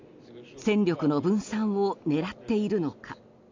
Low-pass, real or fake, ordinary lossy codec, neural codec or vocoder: 7.2 kHz; real; MP3, 64 kbps; none